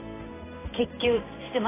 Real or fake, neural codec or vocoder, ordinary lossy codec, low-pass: fake; codec, 16 kHz in and 24 kHz out, 1 kbps, XY-Tokenizer; AAC, 16 kbps; 3.6 kHz